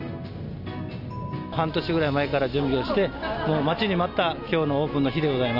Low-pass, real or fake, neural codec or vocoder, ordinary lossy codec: 5.4 kHz; real; none; none